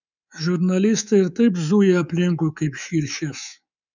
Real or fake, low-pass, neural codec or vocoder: fake; 7.2 kHz; codec, 24 kHz, 3.1 kbps, DualCodec